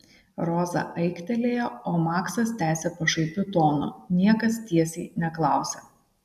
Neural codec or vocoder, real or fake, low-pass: none; real; 14.4 kHz